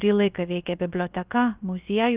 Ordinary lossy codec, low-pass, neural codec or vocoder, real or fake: Opus, 32 kbps; 3.6 kHz; codec, 16 kHz, about 1 kbps, DyCAST, with the encoder's durations; fake